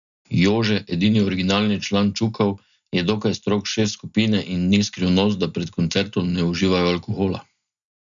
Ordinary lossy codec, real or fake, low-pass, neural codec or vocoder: none; real; 7.2 kHz; none